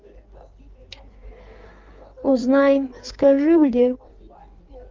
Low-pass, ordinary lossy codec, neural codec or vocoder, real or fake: 7.2 kHz; Opus, 24 kbps; codec, 16 kHz in and 24 kHz out, 1.1 kbps, FireRedTTS-2 codec; fake